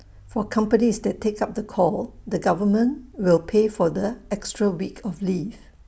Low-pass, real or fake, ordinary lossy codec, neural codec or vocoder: none; real; none; none